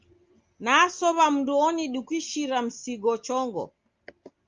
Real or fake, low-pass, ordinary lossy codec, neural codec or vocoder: real; 7.2 kHz; Opus, 32 kbps; none